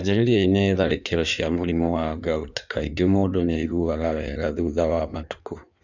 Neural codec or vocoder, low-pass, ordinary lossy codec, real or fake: codec, 16 kHz in and 24 kHz out, 1.1 kbps, FireRedTTS-2 codec; 7.2 kHz; none; fake